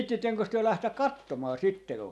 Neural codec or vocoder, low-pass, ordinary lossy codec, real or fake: none; none; none; real